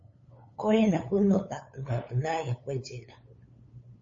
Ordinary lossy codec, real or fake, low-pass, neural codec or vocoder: MP3, 32 kbps; fake; 7.2 kHz; codec, 16 kHz, 8 kbps, FunCodec, trained on LibriTTS, 25 frames a second